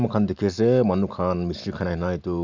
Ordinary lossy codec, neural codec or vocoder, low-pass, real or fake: Opus, 64 kbps; none; 7.2 kHz; real